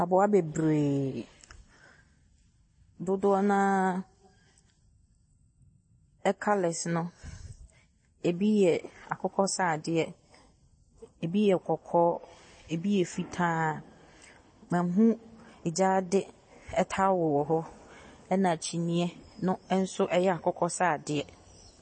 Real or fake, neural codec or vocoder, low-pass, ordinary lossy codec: real; none; 9.9 kHz; MP3, 32 kbps